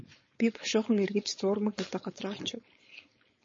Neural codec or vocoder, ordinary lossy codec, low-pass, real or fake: codec, 16 kHz, 4.8 kbps, FACodec; MP3, 32 kbps; 7.2 kHz; fake